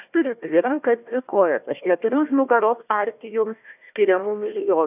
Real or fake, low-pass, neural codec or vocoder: fake; 3.6 kHz; codec, 16 kHz, 1 kbps, FunCodec, trained on Chinese and English, 50 frames a second